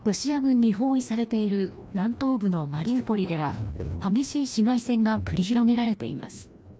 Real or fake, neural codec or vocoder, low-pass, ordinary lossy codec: fake; codec, 16 kHz, 1 kbps, FreqCodec, larger model; none; none